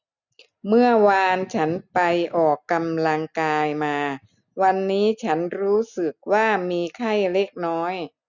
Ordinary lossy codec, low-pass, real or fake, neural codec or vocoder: none; 7.2 kHz; real; none